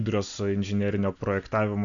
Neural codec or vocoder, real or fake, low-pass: none; real; 7.2 kHz